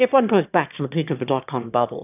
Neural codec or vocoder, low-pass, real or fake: autoencoder, 22.05 kHz, a latent of 192 numbers a frame, VITS, trained on one speaker; 3.6 kHz; fake